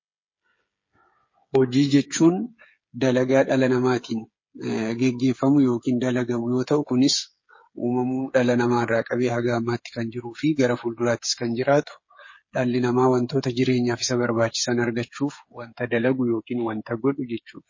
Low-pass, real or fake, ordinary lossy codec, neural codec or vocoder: 7.2 kHz; fake; MP3, 32 kbps; codec, 16 kHz, 8 kbps, FreqCodec, smaller model